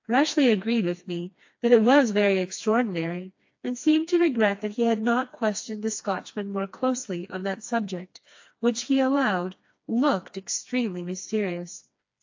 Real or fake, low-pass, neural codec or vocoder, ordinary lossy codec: fake; 7.2 kHz; codec, 16 kHz, 2 kbps, FreqCodec, smaller model; AAC, 48 kbps